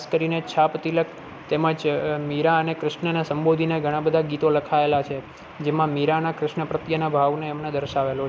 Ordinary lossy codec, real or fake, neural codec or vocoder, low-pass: none; real; none; none